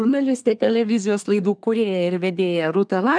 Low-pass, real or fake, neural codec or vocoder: 9.9 kHz; fake; codec, 24 kHz, 1 kbps, SNAC